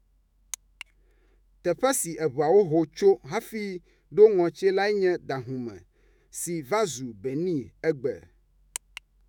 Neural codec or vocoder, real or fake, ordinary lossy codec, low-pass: autoencoder, 48 kHz, 128 numbers a frame, DAC-VAE, trained on Japanese speech; fake; none; 19.8 kHz